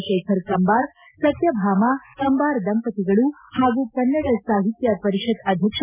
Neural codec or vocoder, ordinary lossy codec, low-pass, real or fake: none; none; 3.6 kHz; real